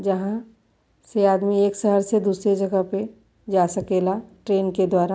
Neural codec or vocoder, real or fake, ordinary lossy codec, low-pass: none; real; none; none